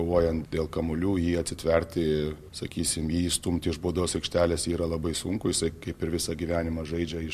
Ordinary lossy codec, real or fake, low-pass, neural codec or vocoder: MP3, 64 kbps; real; 14.4 kHz; none